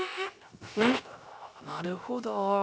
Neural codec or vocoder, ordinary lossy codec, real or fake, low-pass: codec, 16 kHz, 0.3 kbps, FocalCodec; none; fake; none